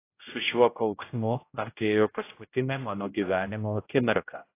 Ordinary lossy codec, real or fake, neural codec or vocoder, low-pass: AAC, 24 kbps; fake; codec, 16 kHz, 0.5 kbps, X-Codec, HuBERT features, trained on general audio; 3.6 kHz